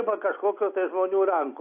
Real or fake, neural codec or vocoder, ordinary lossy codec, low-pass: real; none; AAC, 32 kbps; 3.6 kHz